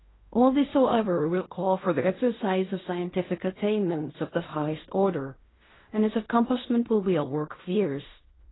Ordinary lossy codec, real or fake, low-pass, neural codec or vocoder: AAC, 16 kbps; fake; 7.2 kHz; codec, 16 kHz in and 24 kHz out, 0.4 kbps, LongCat-Audio-Codec, fine tuned four codebook decoder